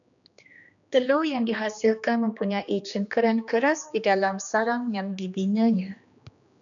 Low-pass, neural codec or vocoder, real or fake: 7.2 kHz; codec, 16 kHz, 2 kbps, X-Codec, HuBERT features, trained on general audio; fake